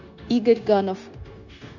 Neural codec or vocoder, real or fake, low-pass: codec, 16 kHz, 0.9 kbps, LongCat-Audio-Codec; fake; 7.2 kHz